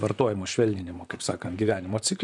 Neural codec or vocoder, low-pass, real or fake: vocoder, 44.1 kHz, 128 mel bands, Pupu-Vocoder; 10.8 kHz; fake